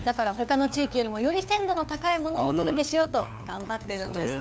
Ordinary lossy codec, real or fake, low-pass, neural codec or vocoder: none; fake; none; codec, 16 kHz, 2 kbps, FunCodec, trained on LibriTTS, 25 frames a second